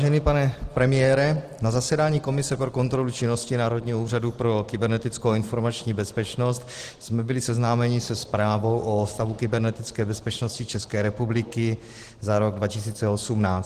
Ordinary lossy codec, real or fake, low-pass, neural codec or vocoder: Opus, 16 kbps; real; 14.4 kHz; none